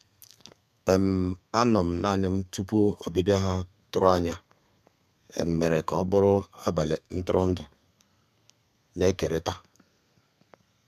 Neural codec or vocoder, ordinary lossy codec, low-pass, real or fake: codec, 32 kHz, 1.9 kbps, SNAC; none; 14.4 kHz; fake